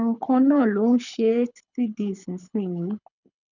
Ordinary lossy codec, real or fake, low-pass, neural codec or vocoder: none; fake; 7.2 kHz; codec, 24 kHz, 6 kbps, HILCodec